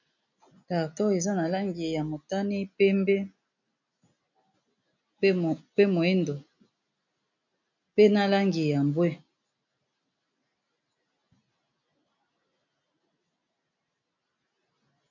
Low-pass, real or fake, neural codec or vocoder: 7.2 kHz; real; none